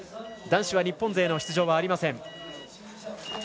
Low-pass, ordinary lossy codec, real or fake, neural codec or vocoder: none; none; real; none